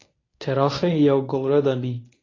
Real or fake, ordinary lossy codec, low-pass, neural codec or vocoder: fake; AAC, 32 kbps; 7.2 kHz; codec, 24 kHz, 0.9 kbps, WavTokenizer, medium speech release version 1